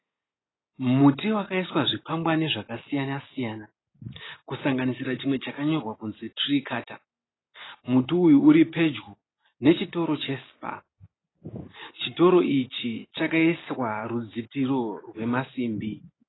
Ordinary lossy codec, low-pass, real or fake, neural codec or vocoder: AAC, 16 kbps; 7.2 kHz; real; none